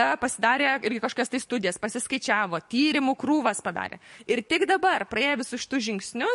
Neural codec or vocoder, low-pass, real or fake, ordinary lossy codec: codec, 44.1 kHz, 7.8 kbps, DAC; 14.4 kHz; fake; MP3, 48 kbps